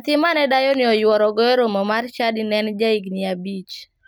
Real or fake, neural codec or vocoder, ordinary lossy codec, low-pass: real; none; none; none